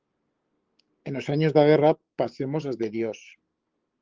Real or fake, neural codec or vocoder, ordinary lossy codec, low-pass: fake; codec, 16 kHz, 6 kbps, DAC; Opus, 16 kbps; 7.2 kHz